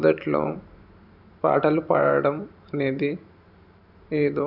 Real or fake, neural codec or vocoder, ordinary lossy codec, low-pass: fake; vocoder, 44.1 kHz, 128 mel bands every 512 samples, BigVGAN v2; none; 5.4 kHz